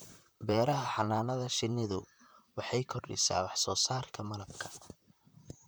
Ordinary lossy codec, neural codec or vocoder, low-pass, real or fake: none; vocoder, 44.1 kHz, 128 mel bands, Pupu-Vocoder; none; fake